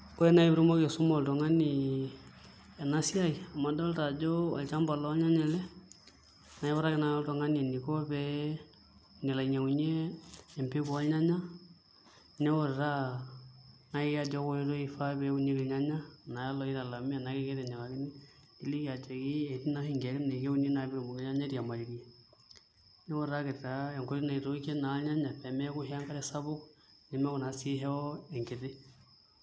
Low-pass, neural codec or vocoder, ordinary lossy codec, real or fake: none; none; none; real